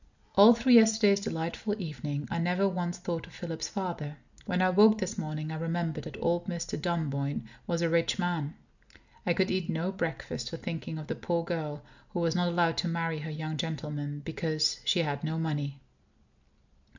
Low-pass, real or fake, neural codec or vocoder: 7.2 kHz; real; none